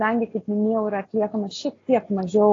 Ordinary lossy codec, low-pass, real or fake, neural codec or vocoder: AAC, 32 kbps; 7.2 kHz; real; none